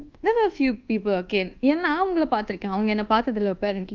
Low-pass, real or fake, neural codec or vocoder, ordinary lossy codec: 7.2 kHz; fake; codec, 24 kHz, 1.2 kbps, DualCodec; Opus, 32 kbps